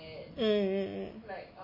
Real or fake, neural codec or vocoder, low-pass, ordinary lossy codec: real; none; 5.4 kHz; MP3, 24 kbps